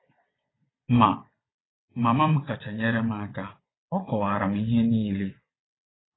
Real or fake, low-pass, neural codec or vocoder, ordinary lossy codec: fake; 7.2 kHz; vocoder, 22.05 kHz, 80 mel bands, WaveNeXt; AAC, 16 kbps